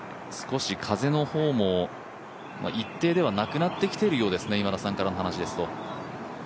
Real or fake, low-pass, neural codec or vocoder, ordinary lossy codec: real; none; none; none